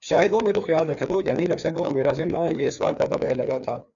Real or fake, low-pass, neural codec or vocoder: fake; 7.2 kHz; codec, 16 kHz, 2 kbps, FreqCodec, larger model